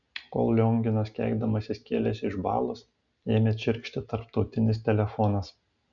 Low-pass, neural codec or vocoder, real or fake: 7.2 kHz; none; real